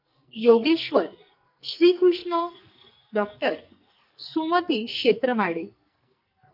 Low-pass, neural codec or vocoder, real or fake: 5.4 kHz; codec, 44.1 kHz, 2.6 kbps, SNAC; fake